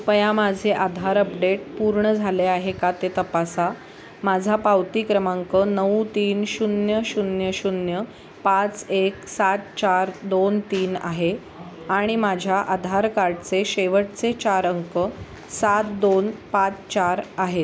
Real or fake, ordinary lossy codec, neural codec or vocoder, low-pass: real; none; none; none